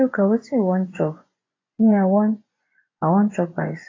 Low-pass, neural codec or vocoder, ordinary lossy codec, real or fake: 7.2 kHz; vocoder, 24 kHz, 100 mel bands, Vocos; AAC, 32 kbps; fake